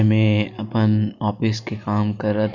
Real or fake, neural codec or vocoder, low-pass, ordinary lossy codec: real; none; 7.2 kHz; none